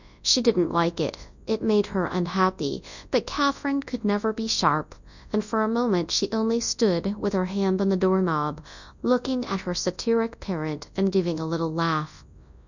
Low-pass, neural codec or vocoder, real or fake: 7.2 kHz; codec, 24 kHz, 0.9 kbps, WavTokenizer, large speech release; fake